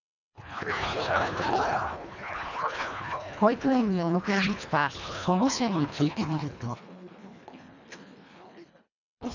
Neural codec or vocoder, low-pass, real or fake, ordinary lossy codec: codec, 24 kHz, 1.5 kbps, HILCodec; 7.2 kHz; fake; none